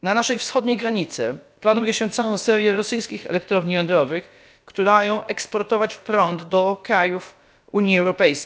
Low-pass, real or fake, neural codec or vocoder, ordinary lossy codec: none; fake; codec, 16 kHz, about 1 kbps, DyCAST, with the encoder's durations; none